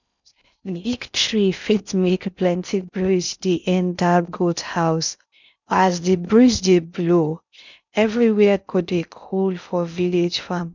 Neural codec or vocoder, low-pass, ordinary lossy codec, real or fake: codec, 16 kHz in and 24 kHz out, 0.6 kbps, FocalCodec, streaming, 4096 codes; 7.2 kHz; none; fake